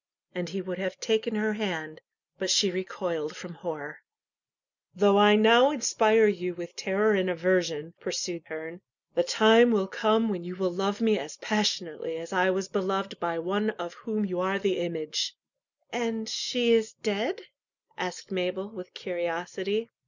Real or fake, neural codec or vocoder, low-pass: real; none; 7.2 kHz